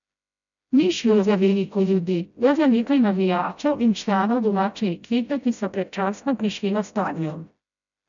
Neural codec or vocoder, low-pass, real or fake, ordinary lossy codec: codec, 16 kHz, 0.5 kbps, FreqCodec, smaller model; 7.2 kHz; fake; none